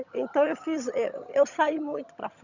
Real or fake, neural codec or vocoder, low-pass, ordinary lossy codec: fake; vocoder, 22.05 kHz, 80 mel bands, HiFi-GAN; 7.2 kHz; none